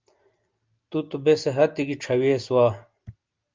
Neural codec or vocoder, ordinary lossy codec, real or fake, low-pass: none; Opus, 24 kbps; real; 7.2 kHz